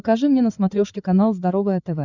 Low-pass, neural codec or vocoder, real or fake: 7.2 kHz; codec, 16 kHz, 16 kbps, FreqCodec, larger model; fake